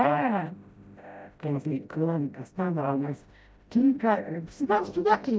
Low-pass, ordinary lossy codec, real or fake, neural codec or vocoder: none; none; fake; codec, 16 kHz, 0.5 kbps, FreqCodec, smaller model